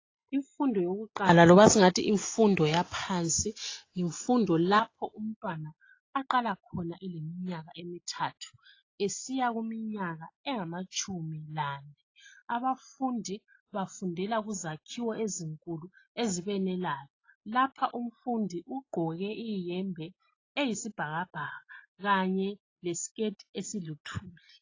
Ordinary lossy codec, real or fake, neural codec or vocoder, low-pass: AAC, 32 kbps; real; none; 7.2 kHz